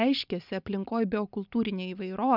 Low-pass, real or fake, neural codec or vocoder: 5.4 kHz; real; none